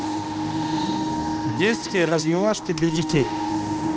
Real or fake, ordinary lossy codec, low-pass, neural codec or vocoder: fake; none; none; codec, 16 kHz, 1 kbps, X-Codec, HuBERT features, trained on balanced general audio